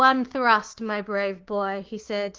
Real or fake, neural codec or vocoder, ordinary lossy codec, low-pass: fake; codec, 16 kHz, 6 kbps, DAC; Opus, 16 kbps; 7.2 kHz